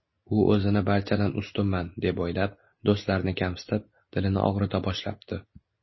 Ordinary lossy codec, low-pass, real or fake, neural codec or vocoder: MP3, 24 kbps; 7.2 kHz; real; none